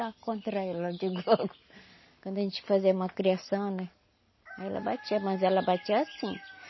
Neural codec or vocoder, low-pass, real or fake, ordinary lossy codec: none; 7.2 kHz; real; MP3, 24 kbps